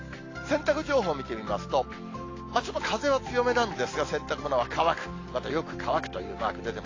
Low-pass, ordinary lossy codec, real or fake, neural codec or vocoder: 7.2 kHz; AAC, 32 kbps; real; none